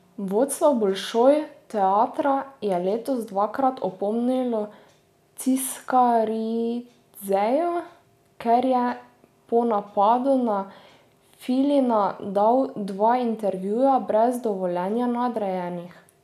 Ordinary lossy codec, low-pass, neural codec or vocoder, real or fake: none; 14.4 kHz; none; real